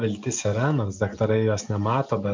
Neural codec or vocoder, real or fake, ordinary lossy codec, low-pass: none; real; MP3, 64 kbps; 7.2 kHz